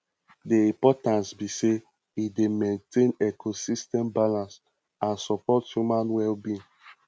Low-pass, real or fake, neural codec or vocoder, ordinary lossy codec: none; real; none; none